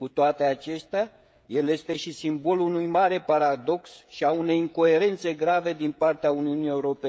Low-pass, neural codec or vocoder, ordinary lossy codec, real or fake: none; codec, 16 kHz, 16 kbps, FreqCodec, smaller model; none; fake